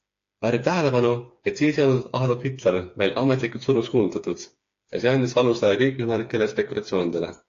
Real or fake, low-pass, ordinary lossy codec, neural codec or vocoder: fake; 7.2 kHz; AAC, 48 kbps; codec, 16 kHz, 4 kbps, FreqCodec, smaller model